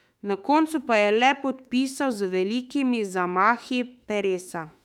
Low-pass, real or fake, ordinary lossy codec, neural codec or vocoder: 19.8 kHz; fake; none; autoencoder, 48 kHz, 32 numbers a frame, DAC-VAE, trained on Japanese speech